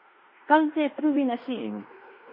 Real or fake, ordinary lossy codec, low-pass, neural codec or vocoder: fake; MP3, 32 kbps; 5.4 kHz; codec, 16 kHz in and 24 kHz out, 0.9 kbps, LongCat-Audio-Codec, fine tuned four codebook decoder